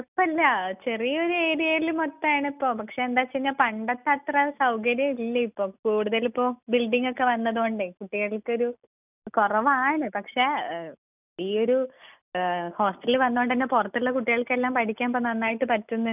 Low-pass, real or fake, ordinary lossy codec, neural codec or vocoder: 3.6 kHz; real; none; none